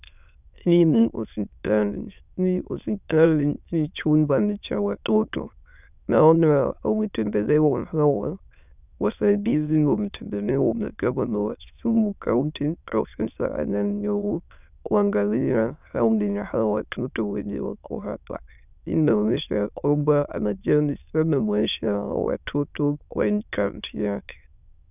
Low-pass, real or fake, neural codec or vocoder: 3.6 kHz; fake; autoencoder, 22.05 kHz, a latent of 192 numbers a frame, VITS, trained on many speakers